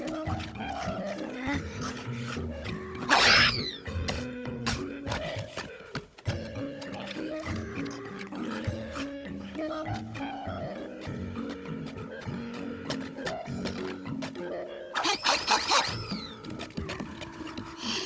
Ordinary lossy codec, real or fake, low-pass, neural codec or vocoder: none; fake; none; codec, 16 kHz, 16 kbps, FunCodec, trained on Chinese and English, 50 frames a second